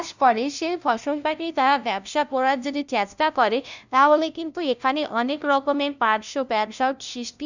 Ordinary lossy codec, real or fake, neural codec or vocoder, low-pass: none; fake; codec, 16 kHz, 0.5 kbps, FunCodec, trained on LibriTTS, 25 frames a second; 7.2 kHz